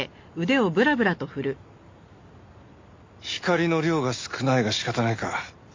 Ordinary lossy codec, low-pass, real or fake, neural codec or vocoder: MP3, 48 kbps; 7.2 kHz; real; none